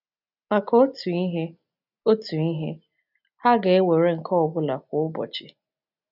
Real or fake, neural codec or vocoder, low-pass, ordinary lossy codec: real; none; 5.4 kHz; none